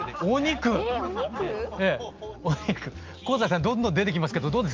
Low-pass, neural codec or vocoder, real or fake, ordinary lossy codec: 7.2 kHz; none; real; Opus, 24 kbps